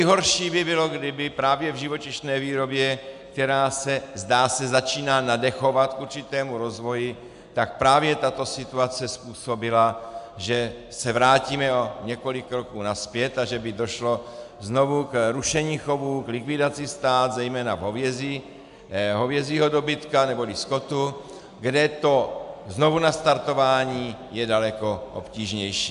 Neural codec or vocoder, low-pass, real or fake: none; 10.8 kHz; real